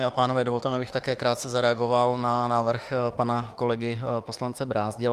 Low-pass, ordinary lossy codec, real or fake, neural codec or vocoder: 14.4 kHz; Opus, 32 kbps; fake; autoencoder, 48 kHz, 32 numbers a frame, DAC-VAE, trained on Japanese speech